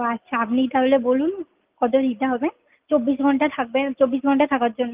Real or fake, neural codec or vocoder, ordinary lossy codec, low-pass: real; none; Opus, 32 kbps; 3.6 kHz